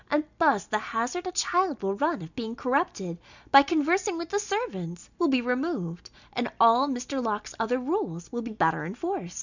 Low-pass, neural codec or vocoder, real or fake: 7.2 kHz; none; real